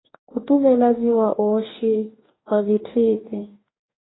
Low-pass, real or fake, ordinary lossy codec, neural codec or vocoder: 7.2 kHz; fake; AAC, 16 kbps; codec, 44.1 kHz, 2.6 kbps, DAC